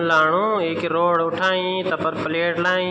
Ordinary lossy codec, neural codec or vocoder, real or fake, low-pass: none; none; real; none